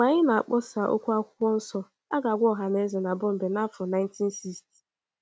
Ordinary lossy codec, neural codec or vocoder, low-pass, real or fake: none; none; none; real